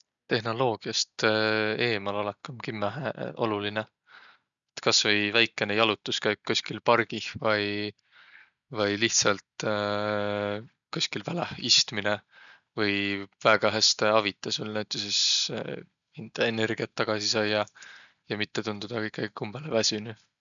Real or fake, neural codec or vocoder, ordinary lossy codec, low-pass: real; none; none; 7.2 kHz